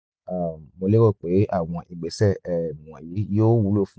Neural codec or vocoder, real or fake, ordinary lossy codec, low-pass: none; real; none; none